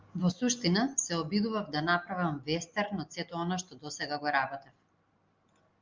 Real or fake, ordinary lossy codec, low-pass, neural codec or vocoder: real; Opus, 32 kbps; 7.2 kHz; none